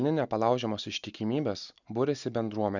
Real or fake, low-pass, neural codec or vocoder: real; 7.2 kHz; none